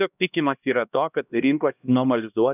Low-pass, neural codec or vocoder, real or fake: 3.6 kHz; codec, 16 kHz, 1 kbps, X-Codec, HuBERT features, trained on LibriSpeech; fake